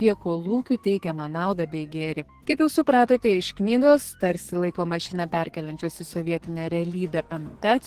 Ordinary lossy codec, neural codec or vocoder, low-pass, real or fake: Opus, 16 kbps; codec, 44.1 kHz, 2.6 kbps, SNAC; 14.4 kHz; fake